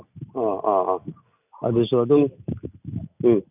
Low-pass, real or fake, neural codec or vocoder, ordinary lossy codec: 3.6 kHz; real; none; none